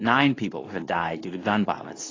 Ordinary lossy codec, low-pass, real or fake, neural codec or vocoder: AAC, 32 kbps; 7.2 kHz; fake; codec, 24 kHz, 0.9 kbps, WavTokenizer, medium speech release version 2